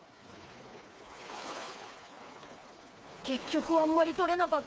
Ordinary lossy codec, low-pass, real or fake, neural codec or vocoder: none; none; fake; codec, 16 kHz, 4 kbps, FreqCodec, smaller model